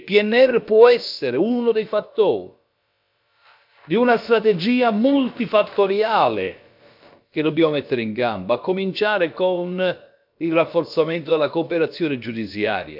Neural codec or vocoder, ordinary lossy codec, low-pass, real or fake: codec, 16 kHz, about 1 kbps, DyCAST, with the encoder's durations; MP3, 48 kbps; 5.4 kHz; fake